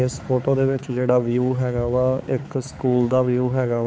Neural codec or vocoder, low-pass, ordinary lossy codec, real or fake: codec, 16 kHz, 4 kbps, X-Codec, HuBERT features, trained on balanced general audio; none; none; fake